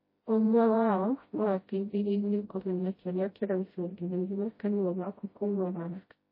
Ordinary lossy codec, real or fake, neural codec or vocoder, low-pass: MP3, 24 kbps; fake; codec, 16 kHz, 0.5 kbps, FreqCodec, smaller model; 5.4 kHz